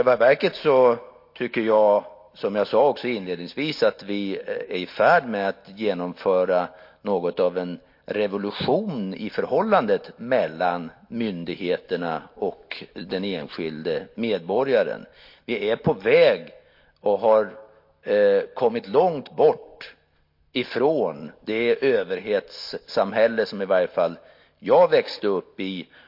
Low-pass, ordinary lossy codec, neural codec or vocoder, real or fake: 5.4 kHz; MP3, 32 kbps; none; real